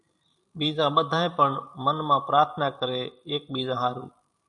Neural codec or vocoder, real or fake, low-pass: vocoder, 44.1 kHz, 128 mel bands every 512 samples, BigVGAN v2; fake; 10.8 kHz